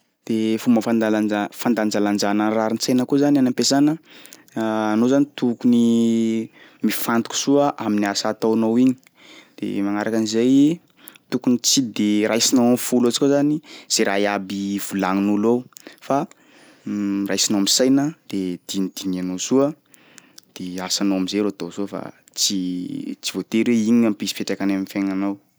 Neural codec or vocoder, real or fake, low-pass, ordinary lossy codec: none; real; none; none